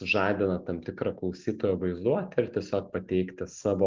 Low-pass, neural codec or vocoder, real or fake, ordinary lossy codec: 7.2 kHz; none; real; Opus, 32 kbps